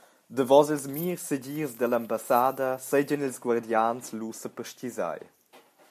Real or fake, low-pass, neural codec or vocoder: real; 14.4 kHz; none